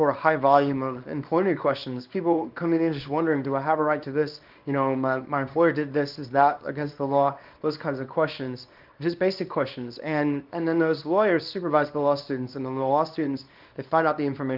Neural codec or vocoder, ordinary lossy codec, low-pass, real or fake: codec, 24 kHz, 0.9 kbps, WavTokenizer, small release; Opus, 24 kbps; 5.4 kHz; fake